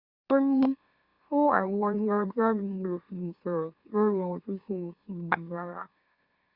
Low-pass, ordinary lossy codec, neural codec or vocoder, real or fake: 5.4 kHz; Opus, 64 kbps; autoencoder, 44.1 kHz, a latent of 192 numbers a frame, MeloTTS; fake